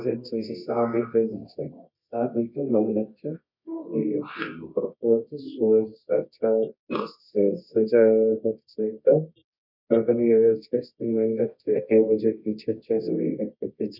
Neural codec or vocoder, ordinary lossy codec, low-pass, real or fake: codec, 24 kHz, 0.9 kbps, WavTokenizer, medium music audio release; none; 5.4 kHz; fake